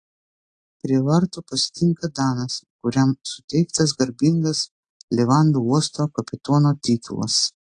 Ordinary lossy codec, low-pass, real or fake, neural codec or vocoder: AAC, 48 kbps; 9.9 kHz; real; none